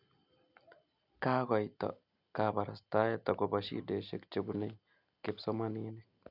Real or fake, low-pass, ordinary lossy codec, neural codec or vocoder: real; 5.4 kHz; none; none